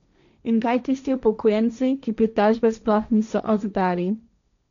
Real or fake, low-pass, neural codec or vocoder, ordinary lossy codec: fake; 7.2 kHz; codec, 16 kHz, 1.1 kbps, Voila-Tokenizer; none